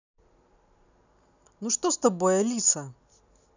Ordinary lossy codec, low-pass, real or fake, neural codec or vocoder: none; 7.2 kHz; real; none